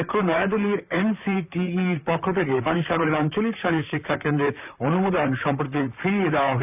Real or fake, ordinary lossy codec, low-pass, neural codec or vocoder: fake; none; 3.6 kHz; vocoder, 44.1 kHz, 128 mel bands, Pupu-Vocoder